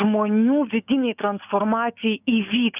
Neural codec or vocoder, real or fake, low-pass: codec, 16 kHz, 6 kbps, DAC; fake; 3.6 kHz